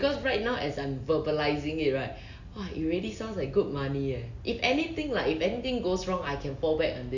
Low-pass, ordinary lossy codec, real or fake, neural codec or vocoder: 7.2 kHz; none; real; none